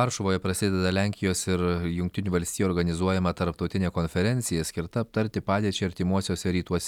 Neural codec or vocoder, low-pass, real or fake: none; 19.8 kHz; real